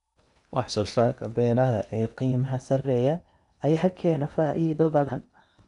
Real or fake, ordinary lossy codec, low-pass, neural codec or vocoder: fake; none; 10.8 kHz; codec, 16 kHz in and 24 kHz out, 0.8 kbps, FocalCodec, streaming, 65536 codes